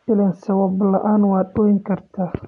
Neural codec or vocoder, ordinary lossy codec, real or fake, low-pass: none; none; real; 10.8 kHz